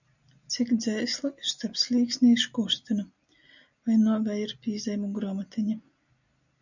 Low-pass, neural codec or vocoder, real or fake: 7.2 kHz; none; real